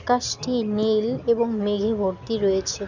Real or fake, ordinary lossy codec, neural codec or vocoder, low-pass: real; none; none; 7.2 kHz